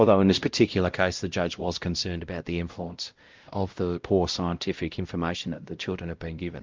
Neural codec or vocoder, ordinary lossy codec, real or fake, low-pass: codec, 16 kHz, 0.5 kbps, X-Codec, WavLM features, trained on Multilingual LibriSpeech; Opus, 32 kbps; fake; 7.2 kHz